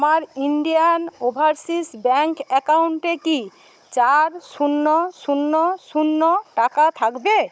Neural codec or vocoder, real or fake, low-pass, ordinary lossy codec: codec, 16 kHz, 16 kbps, FunCodec, trained on Chinese and English, 50 frames a second; fake; none; none